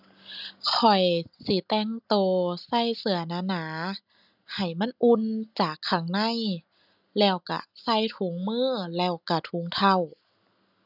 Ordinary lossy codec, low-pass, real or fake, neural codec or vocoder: none; 5.4 kHz; real; none